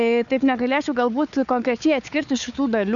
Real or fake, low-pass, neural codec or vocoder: fake; 7.2 kHz; codec, 16 kHz, 4 kbps, FunCodec, trained on Chinese and English, 50 frames a second